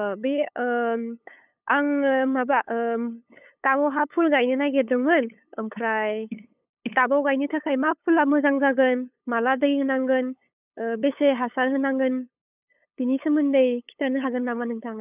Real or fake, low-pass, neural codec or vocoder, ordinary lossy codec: fake; 3.6 kHz; codec, 16 kHz, 8 kbps, FunCodec, trained on LibriTTS, 25 frames a second; none